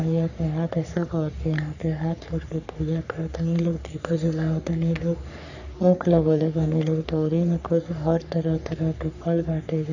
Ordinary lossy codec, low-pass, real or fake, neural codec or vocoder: none; 7.2 kHz; fake; codec, 44.1 kHz, 3.4 kbps, Pupu-Codec